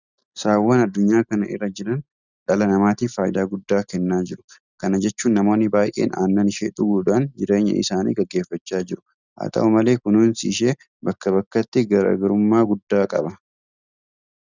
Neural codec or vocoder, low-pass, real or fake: none; 7.2 kHz; real